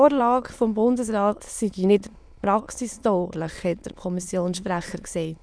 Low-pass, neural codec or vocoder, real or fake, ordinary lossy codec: none; autoencoder, 22.05 kHz, a latent of 192 numbers a frame, VITS, trained on many speakers; fake; none